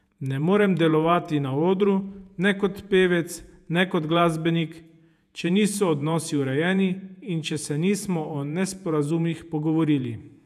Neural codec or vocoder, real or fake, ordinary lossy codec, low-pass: vocoder, 48 kHz, 128 mel bands, Vocos; fake; none; 14.4 kHz